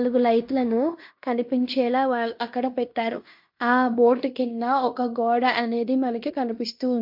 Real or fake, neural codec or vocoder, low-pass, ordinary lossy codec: fake; codec, 16 kHz in and 24 kHz out, 0.9 kbps, LongCat-Audio-Codec, fine tuned four codebook decoder; 5.4 kHz; MP3, 48 kbps